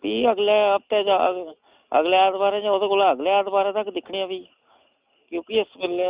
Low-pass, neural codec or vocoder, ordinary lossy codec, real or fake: 3.6 kHz; none; Opus, 64 kbps; real